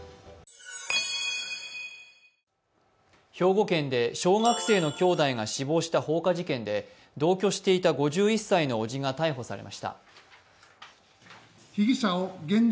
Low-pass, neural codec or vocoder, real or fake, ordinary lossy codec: none; none; real; none